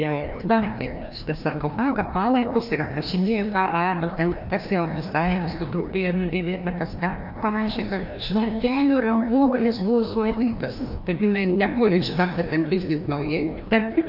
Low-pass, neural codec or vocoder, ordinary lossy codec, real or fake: 5.4 kHz; codec, 16 kHz, 1 kbps, FreqCodec, larger model; AAC, 48 kbps; fake